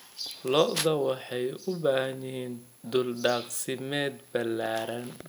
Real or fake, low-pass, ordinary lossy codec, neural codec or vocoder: real; none; none; none